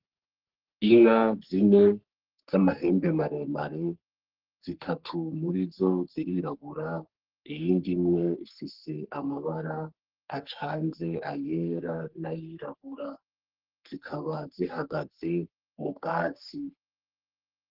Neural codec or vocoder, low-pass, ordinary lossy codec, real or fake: codec, 44.1 kHz, 3.4 kbps, Pupu-Codec; 5.4 kHz; Opus, 16 kbps; fake